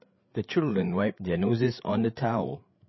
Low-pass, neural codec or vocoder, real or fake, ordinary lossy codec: 7.2 kHz; codec, 16 kHz, 16 kbps, FreqCodec, larger model; fake; MP3, 24 kbps